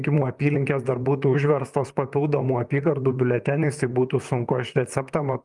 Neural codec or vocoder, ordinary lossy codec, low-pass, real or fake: vocoder, 44.1 kHz, 128 mel bands, Pupu-Vocoder; Opus, 32 kbps; 10.8 kHz; fake